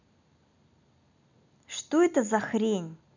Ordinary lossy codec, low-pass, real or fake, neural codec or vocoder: none; 7.2 kHz; real; none